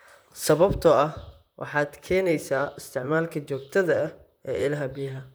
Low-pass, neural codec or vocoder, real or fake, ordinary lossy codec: none; vocoder, 44.1 kHz, 128 mel bands, Pupu-Vocoder; fake; none